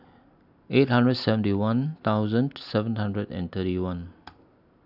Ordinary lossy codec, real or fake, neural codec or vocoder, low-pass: none; real; none; 5.4 kHz